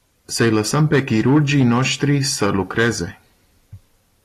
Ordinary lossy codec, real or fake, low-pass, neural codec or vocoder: AAC, 48 kbps; real; 14.4 kHz; none